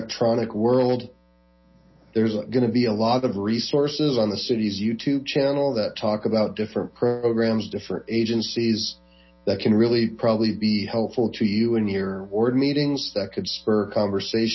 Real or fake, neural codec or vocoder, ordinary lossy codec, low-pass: real; none; MP3, 24 kbps; 7.2 kHz